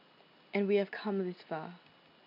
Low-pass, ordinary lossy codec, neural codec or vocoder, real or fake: 5.4 kHz; none; none; real